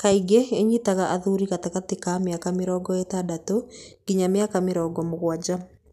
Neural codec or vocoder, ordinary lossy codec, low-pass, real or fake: none; none; 14.4 kHz; real